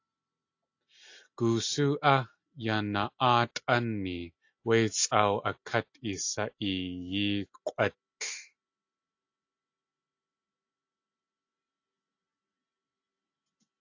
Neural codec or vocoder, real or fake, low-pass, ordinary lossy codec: none; real; 7.2 kHz; AAC, 48 kbps